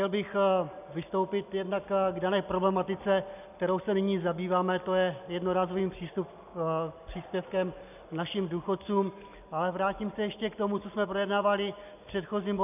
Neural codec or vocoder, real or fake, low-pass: none; real; 3.6 kHz